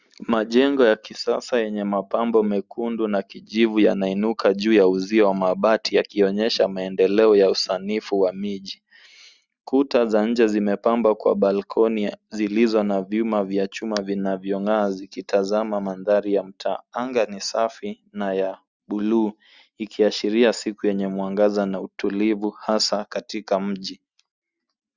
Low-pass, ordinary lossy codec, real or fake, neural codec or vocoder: 7.2 kHz; Opus, 64 kbps; real; none